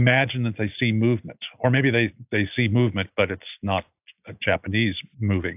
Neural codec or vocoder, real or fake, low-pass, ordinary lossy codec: none; real; 3.6 kHz; AAC, 32 kbps